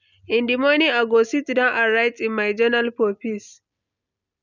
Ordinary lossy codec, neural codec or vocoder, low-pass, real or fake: none; none; 7.2 kHz; real